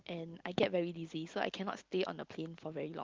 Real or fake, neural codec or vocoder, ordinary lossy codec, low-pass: real; none; Opus, 32 kbps; 7.2 kHz